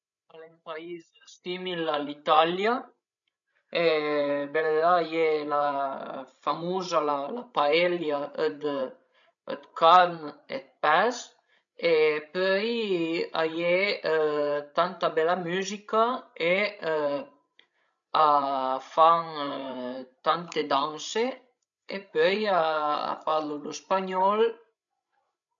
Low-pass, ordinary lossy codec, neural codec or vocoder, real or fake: 7.2 kHz; none; codec, 16 kHz, 16 kbps, FreqCodec, larger model; fake